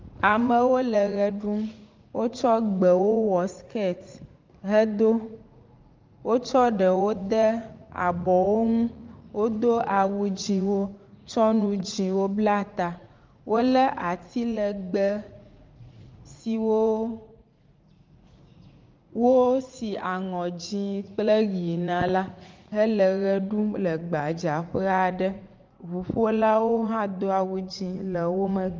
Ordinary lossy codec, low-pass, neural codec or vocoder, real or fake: Opus, 24 kbps; 7.2 kHz; vocoder, 44.1 kHz, 80 mel bands, Vocos; fake